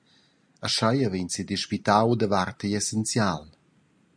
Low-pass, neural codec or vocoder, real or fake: 9.9 kHz; none; real